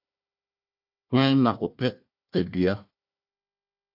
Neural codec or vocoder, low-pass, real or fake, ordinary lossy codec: codec, 16 kHz, 1 kbps, FunCodec, trained on Chinese and English, 50 frames a second; 5.4 kHz; fake; MP3, 48 kbps